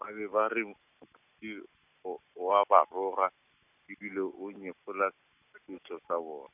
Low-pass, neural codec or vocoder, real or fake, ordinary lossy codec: 3.6 kHz; none; real; none